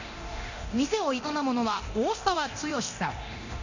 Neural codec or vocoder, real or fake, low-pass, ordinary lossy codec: codec, 24 kHz, 0.9 kbps, DualCodec; fake; 7.2 kHz; none